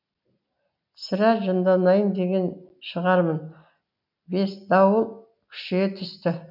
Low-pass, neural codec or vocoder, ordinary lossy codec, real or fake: 5.4 kHz; none; none; real